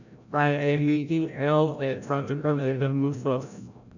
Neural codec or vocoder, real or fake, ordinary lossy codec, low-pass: codec, 16 kHz, 0.5 kbps, FreqCodec, larger model; fake; none; 7.2 kHz